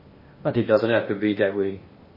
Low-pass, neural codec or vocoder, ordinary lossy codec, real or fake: 5.4 kHz; codec, 16 kHz in and 24 kHz out, 0.8 kbps, FocalCodec, streaming, 65536 codes; MP3, 24 kbps; fake